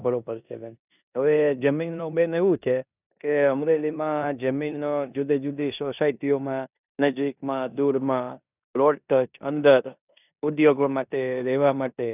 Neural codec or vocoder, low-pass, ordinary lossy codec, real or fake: codec, 16 kHz in and 24 kHz out, 0.9 kbps, LongCat-Audio-Codec, four codebook decoder; 3.6 kHz; none; fake